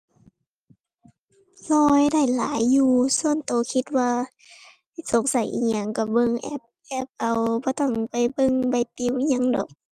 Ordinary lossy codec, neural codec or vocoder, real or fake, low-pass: Opus, 32 kbps; none; real; 14.4 kHz